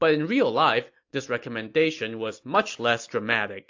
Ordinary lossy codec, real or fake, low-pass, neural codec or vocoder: AAC, 48 kbps; real; 7.2 kHz; none